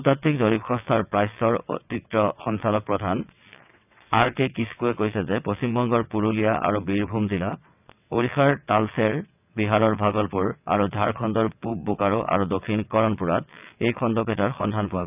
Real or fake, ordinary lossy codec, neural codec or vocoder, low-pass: fake; none; vocoder, 22.05 kHz, 80 mel bands, WaveNeXt; 3.6 kHz